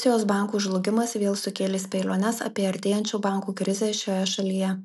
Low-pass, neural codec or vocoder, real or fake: 14.4 kHz; none; real